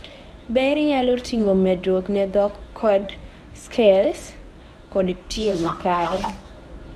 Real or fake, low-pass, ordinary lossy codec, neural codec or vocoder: fake; none; none; codec, 24 kHz, 0.9 kbps, WavTokenizer, medium speech release version 1